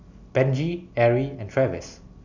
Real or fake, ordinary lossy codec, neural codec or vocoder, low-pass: real; none; none; 7.2 kHz